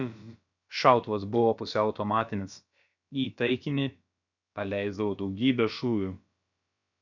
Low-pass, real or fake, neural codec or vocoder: 7.2 kHz; fake; codec, 16 kHz, about 1 kbps, DyCAST, with the encoder's durations